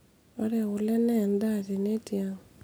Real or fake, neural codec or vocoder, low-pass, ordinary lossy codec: real; none; none; none